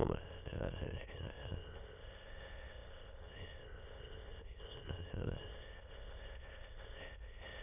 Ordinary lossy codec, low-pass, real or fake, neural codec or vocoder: none; 3.6 kHz; fake; autoencoder, 22.05 kHz, a latent of 192 numbers a frame, VITS, trained on many speakers